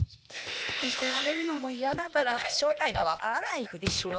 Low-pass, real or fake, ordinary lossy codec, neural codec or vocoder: none; fake; none; codec, 16 kHz, 0.8 kbps, ZipCodec